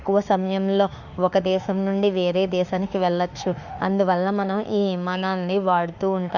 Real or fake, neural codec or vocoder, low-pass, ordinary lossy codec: fake; autoencoder, 48 kHz, 32 numbers a frame, DAC-VAE, trained on Japanese speech; 7.2 kHz; Opus, 64 kbps